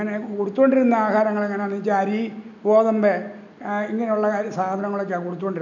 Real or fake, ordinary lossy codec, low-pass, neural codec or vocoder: real; none; 7.2 kHz; none